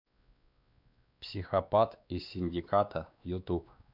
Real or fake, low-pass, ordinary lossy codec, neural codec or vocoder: fake; 5.4 kHz; none; codec, 16 kHz, 2 kbps, X-Codec, WavLM features, trained on Multilingual LibriSpeech